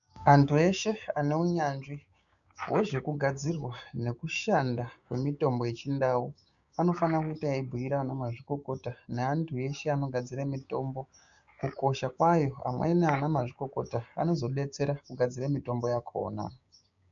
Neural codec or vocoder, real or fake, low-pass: codec, 16 kHz, 6 kbps, DAC; fake; 7.2 kHz